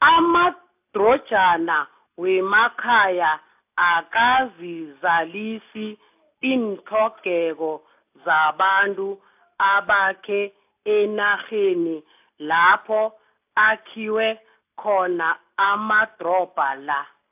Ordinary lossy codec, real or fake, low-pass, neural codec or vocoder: AAC, 32 kbps; real; 3.6 kHz; none